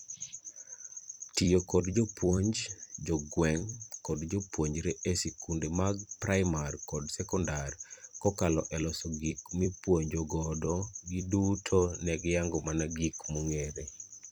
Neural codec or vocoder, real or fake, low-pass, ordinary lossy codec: vocoder, 44.1 kHz, 128 mel bands every 256 samples, BigVGAN v2; fake; none; none